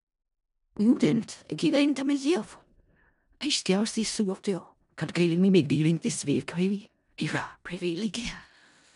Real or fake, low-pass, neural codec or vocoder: fake; 10.8 kHz; codec, 16 kHz in and 24 kHz out, 0.4 kbps, LongCat-Audio-Codec, four codebook decoder